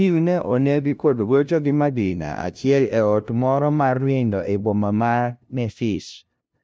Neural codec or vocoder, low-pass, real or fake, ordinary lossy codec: codec, 16 kHz, 0.5 kbps, FunCodec, trained on LibriTTS, 25 frames a second; none; fake; none